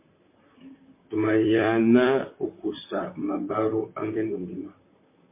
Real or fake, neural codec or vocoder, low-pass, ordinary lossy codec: fake; vocoder, 44.1 kHz, 128 mel bands, Pupu-Vocoder; 3.6 kHz; MP3, 24 kbps